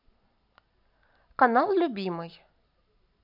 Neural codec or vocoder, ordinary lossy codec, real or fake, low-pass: none; none; real; 5.4 kHz